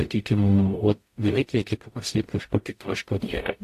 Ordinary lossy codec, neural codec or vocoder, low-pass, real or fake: AAC, 64 kbps; codec, 44.1 kHz, 0.9 kbps, DAC; 14.4 kHz; fake